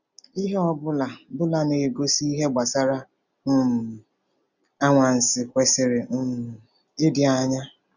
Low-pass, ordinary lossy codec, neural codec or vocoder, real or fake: 7.2 kHz; none; none; real